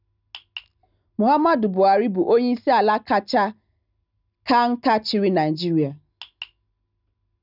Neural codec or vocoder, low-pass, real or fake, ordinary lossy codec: none; 5.4 kHz; real; none